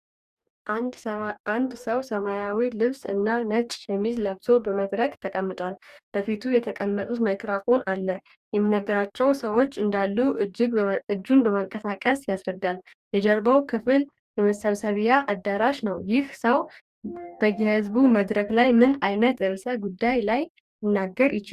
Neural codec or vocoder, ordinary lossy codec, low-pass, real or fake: codec, 44.1 kHz, 2.6 kbps, DAC; Opus, 64 kbps; 14.4 kHz; fake